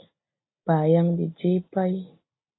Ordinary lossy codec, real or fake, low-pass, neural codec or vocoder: AAC, 16 kbps; real; 7.2 kHz; none